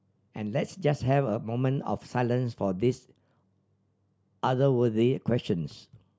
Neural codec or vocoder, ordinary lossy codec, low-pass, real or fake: none; none; none; real